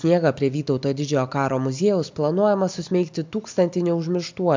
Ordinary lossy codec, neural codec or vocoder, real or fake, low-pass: MP3, 64 kbps; none; real; 7.2 kHz